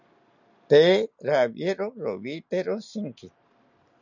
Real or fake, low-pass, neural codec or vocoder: real; 7.2 kHz; none